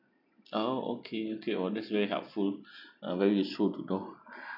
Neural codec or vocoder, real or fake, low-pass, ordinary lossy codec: none; real; 5.4 kHz; none